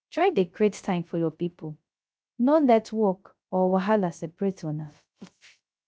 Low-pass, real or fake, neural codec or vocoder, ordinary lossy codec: none; fake; codec, 16 kHz, 0.3 kbps, FocalCodec; none